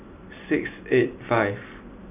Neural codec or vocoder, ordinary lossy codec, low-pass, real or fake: none; none; 3.6 kHz; real